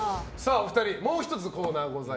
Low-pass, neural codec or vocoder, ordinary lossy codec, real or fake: none; none; none; real